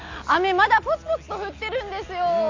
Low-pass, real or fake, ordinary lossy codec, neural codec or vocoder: 7.2 kHz; real; MP3, 64 kbps; none